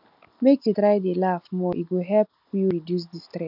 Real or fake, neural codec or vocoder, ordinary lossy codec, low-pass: real; none; none; 5.4 kHz